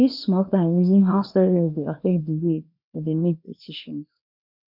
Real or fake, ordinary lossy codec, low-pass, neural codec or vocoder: fake; none; 5.4 kHz; codec, 24 kHz, 0.9 kbps, WavTokenizer, small release